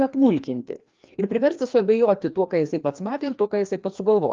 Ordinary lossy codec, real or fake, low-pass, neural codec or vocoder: Opus, 32 kbps; fake; 7.2 kHz; codec, 16 kHz, 2 kbps, FreqCodec, larger model